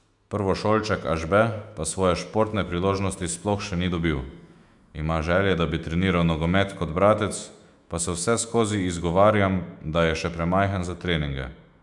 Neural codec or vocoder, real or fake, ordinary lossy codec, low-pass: autoencoder, 48 kHz, 128 numbers a frame, DAC-VAE, trained on Japanese speech; fake; none; 10.8 kHz